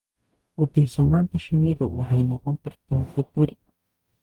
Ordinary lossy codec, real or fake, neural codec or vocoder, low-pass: Opus, 32 kbps; fake; codec, 44.1 kHz, 0.9 kbps, DAC; 19.8 kHz